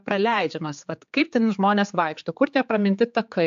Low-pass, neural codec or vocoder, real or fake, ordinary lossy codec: 7.2 kHz; codec, 16 kHz, 4 kbps, X-Codec, HuBERT features, trained on general audio; fake; AAC, 64 kbps